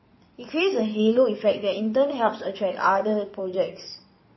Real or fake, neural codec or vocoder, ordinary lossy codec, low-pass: fake; codec, 16 kHz in and 24 kHz out, 2.2 kbps, FireRedTTS-2 codec; MP3, 24 kbps; 7.2 kHz